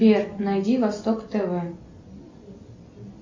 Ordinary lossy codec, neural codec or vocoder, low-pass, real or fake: MP3, 48 kbps; none; 7.2 kHz; real